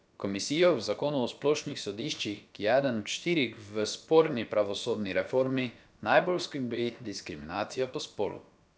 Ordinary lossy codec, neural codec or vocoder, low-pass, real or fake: none; codec, 16 kHz, about 1 kbps, DyCAST, with the encoder's durations; none; fake